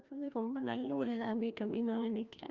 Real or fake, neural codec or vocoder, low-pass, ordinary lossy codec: fake; codec, 16 kHz, 1 kbps, FreqCodec, larger model; 7.2 kHz; Opus, 32 kbps